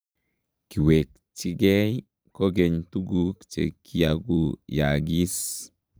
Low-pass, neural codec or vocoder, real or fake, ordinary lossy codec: none; vocoder, 44.1 kHz, 128 mel bands every 512 samples, BigVGAN v2; fake; none